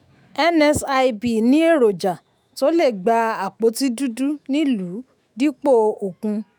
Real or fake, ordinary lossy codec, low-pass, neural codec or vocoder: fake; none; none; autoencoder, 48 kHz, 128 numbers a frame, DAC-VAE, trained on Japanese speech